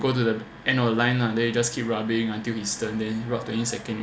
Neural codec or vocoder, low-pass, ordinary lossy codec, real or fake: none; none; none; real